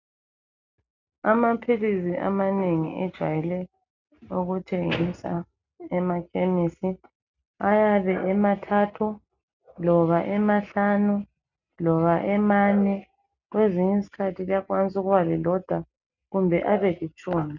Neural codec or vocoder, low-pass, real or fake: none; 7.2 kHz; real